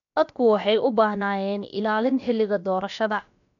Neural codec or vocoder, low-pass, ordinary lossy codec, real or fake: codec, 16 kHz, about 1 kbps, DyCAST, with the encoder's durations; 7.2 kHz; none; fake